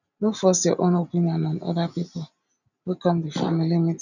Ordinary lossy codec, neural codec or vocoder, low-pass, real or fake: none; none; 7.2 kHz; real